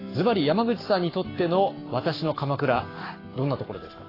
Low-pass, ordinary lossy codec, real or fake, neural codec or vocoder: 5.4 kHz; AAC, 24 kbps; fake; codec, 44.1 kHz, 7.8 kbps, Pupu-Codec